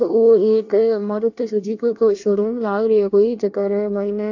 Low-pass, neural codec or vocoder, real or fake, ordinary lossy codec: 7.2 kHz; codec, 24 kHz, 1 kbps, SNAC; fake; AAC, 48 kbps